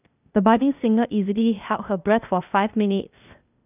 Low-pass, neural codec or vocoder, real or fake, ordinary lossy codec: 3.6 kHz; codec, 16 kHz, 0.8 kbps, ZipCodec; fake; none